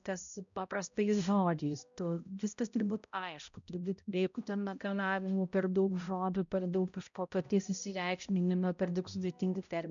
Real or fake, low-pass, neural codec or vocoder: fake; 7.2 kHz; codec, 16 kHz, 0.5 kbps, X-Codec, HuBERT features, trained on balanced general audio